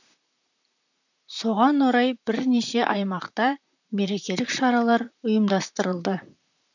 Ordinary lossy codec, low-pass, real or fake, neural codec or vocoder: none; 7.2 kHz; fake; vocoder, 44.1 kHz, 128 mel bands, Pupu-Vocoder